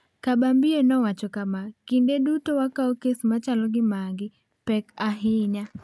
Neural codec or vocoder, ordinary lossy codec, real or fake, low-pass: none; none; real; none